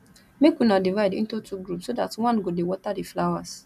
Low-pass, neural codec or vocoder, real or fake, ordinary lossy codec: 14.4 kHz; none; real; none